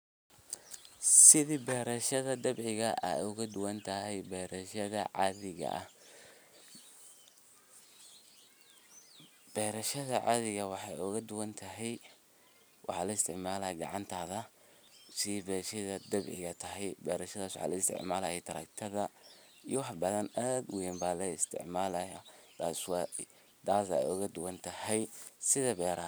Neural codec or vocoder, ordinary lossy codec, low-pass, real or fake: none; none; none; real